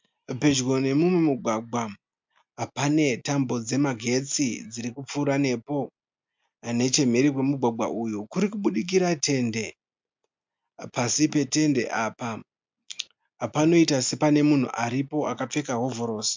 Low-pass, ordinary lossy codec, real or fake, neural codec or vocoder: 7.2 kHz; MP3, 64 kbps; real; none